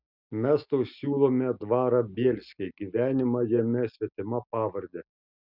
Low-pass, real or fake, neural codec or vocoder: 5.4 kHz; real; none